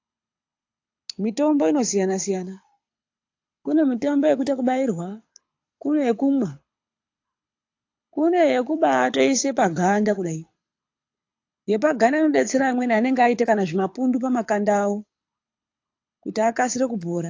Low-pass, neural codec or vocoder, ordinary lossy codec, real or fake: 7.2 kHz; codec, 24 kHz, 6 kbps, HILCodec; AAC, 48 kbps; fake